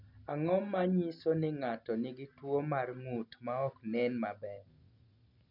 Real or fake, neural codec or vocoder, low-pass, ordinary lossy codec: real; none; 5.4 kHz; none